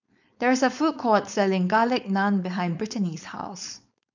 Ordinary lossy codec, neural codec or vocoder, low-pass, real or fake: none; codec, 16 kHz, 4.8 kbps, FACodec; 7.2 kHz; fake